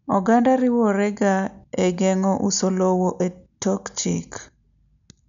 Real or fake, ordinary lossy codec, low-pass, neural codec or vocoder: real; none; 7.2 kHz; none